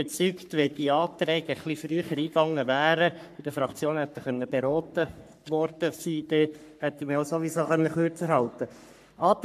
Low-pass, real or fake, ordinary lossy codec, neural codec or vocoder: 14.4 kHz; fake; MP3, 96 kbps; codec, 44.1 kHz, 3.4 kbps, Pupu-Codec